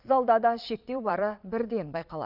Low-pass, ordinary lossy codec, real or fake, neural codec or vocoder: 5.4 kHz; none; fake; vocoder, 22.05 kHz, 80 mel bands, Vocos